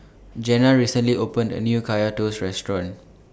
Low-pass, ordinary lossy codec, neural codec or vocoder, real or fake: none; none; none; real